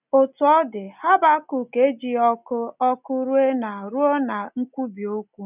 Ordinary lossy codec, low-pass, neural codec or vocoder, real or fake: none; 3.6 kHz; none; real